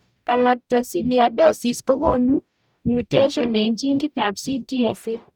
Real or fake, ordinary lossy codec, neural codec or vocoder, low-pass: fake; none; codec, 44.1 kHz, 0.9 kbps, DAC; 19.8 kHz